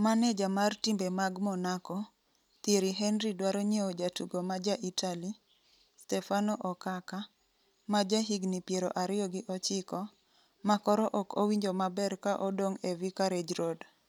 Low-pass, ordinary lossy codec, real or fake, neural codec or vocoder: 19.8 kHz; none; real; none